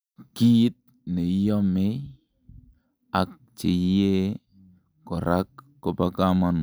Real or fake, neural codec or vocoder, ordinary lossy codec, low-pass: real; none; none; none